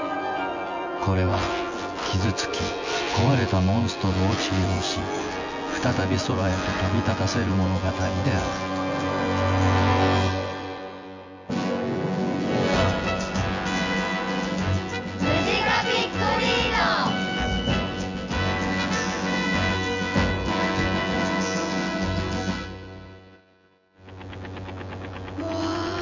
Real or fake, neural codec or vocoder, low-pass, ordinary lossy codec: fake; vocoder, 24 kHz, 100 mel bands, Vocos; 7.2 kHz; MP3, 64 kbps